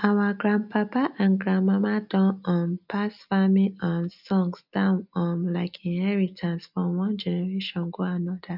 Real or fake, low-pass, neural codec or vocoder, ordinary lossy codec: real; 5.4 kHz; none; none